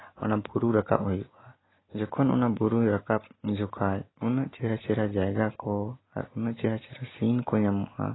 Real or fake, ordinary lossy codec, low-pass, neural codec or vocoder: real; AAC, 16 kbps; 7.2 kHz; none